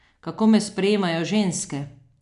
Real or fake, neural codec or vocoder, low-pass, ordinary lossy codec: real; none; 10.8 kHz; none